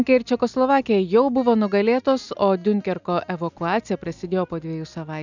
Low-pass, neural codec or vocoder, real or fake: 7.2 kHz; none; real